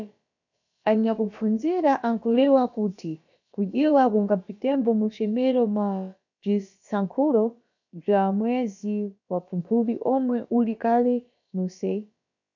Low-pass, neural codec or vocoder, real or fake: 7.2 kHz; codec, 16 kHz, about 1 kbps, DyCAST, with the encoder's durations; fake